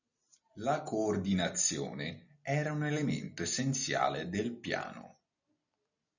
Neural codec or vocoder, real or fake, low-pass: none; real; 7.2 kHz